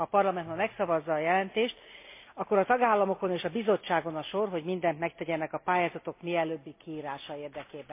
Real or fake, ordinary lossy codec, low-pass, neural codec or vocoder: real; MP3, 24 kbps; 3.6 kHz; none